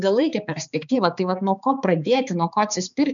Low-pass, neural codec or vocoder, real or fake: 7.2 kHz; codec, 16 kHz, 4 kbps, X-Codec, HuBERT features, trained on balanced general audio; fake